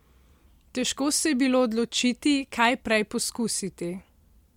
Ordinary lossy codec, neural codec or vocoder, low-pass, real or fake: MP3, 96 kbps; none; 19.8 kHz; real